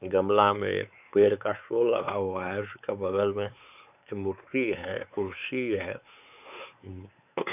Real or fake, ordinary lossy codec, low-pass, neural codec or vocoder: fake; none; 3.6 kHz; codec, 16 kHz, 4 kbps, X-Codec, WavLM features, trained on Multilingual LibriSpeech